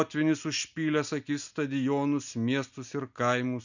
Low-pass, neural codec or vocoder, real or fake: 7.2 kHz; none; real